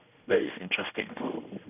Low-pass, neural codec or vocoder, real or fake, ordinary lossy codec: 3.6 kHz; codec, 16 kHz, 1 kbps, X-Codec, HuBERT features, trained on general audio; fake; none